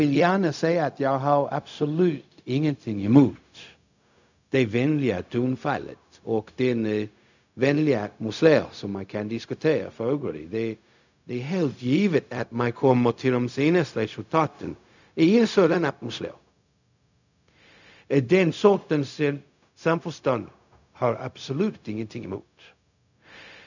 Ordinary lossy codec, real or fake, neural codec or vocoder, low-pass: none; fake; codec, 16 kHz, 0.4 kbps, LongCat-Audio-Codec; 7.2 kHz